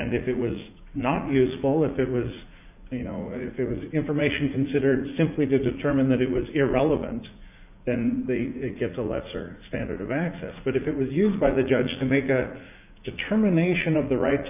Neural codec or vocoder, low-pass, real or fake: vocoder, 44.1 kHz, 80 mel bands, Vocos; 3.6 kHz; fake